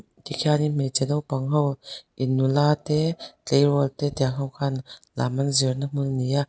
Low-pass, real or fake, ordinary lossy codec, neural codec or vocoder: none; real; none; none